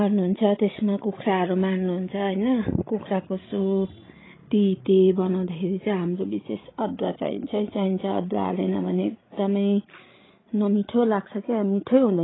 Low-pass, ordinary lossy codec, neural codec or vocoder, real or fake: 7.2 kHz; AAC, 16 kbps; codec, 16 kHz, 16 kbps, FreqCodec, larger model; fake